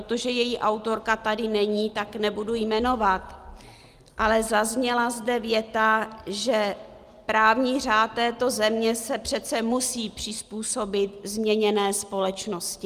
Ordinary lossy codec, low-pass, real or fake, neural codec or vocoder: Opus, 32 kbps; 14.4 kHz; real; none